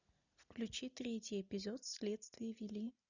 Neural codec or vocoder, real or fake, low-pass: none; real; 7.2 kHz